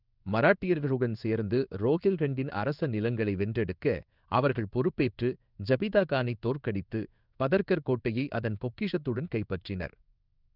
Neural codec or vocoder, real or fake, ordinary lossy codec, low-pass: codec, 16 kHz in and 24 kHz out, 1 kbps, XY-Tokenizer; fake; none; 5.4 kHz